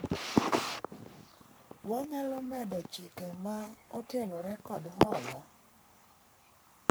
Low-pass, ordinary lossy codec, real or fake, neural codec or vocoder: none; none; fake; codec, 44.1 kHz, 3.4 kbps, Pupu-Codec